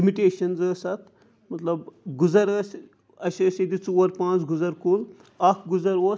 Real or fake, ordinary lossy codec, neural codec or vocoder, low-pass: real; none; none; none